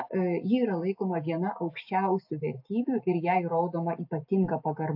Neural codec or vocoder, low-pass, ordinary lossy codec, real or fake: none; 5.4 kHz; AAC, 48 kbps; real